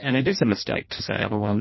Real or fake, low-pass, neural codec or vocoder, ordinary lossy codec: fake; 7.2 kHz; codec, 16 kHz in and 24 kHz out, 0.6 kbps, FireRedTTS-2 codec; MP3, 24 kbps